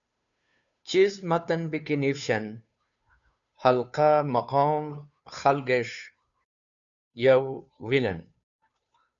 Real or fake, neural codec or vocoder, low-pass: fake; codec, 16 kHz, 2 kbps, FunCodec, trained on Chinese and English, 25 frames a second; 7.2 kHz